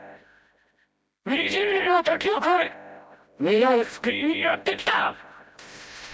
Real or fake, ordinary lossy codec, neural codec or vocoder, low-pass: fake; none; codec, 16 kHz, 0.5 kbps, FreqCodec, smaller model; none